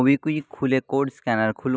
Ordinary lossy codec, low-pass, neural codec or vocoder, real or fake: none; none; none; real